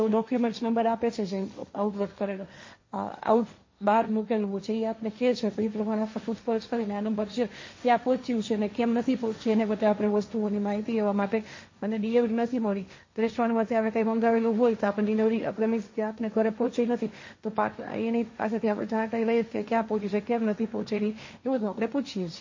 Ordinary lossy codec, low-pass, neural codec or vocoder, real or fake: MP3, 32 kbps; 7.2 kHz; codec, 16 kHz, 1.1 kbps, Voila-Tokenizer; fake